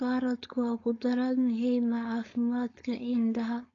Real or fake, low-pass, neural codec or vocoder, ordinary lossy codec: fake; 7.2 kHz; codec, 16 kHz, 4.8 kbps, FACodec; AAC, 32 kbps